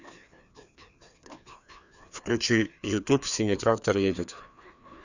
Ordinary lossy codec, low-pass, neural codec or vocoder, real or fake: none; 7.2 kHz; codec, 16 kHz, 2 kbps, FreqCodec, larger model; fake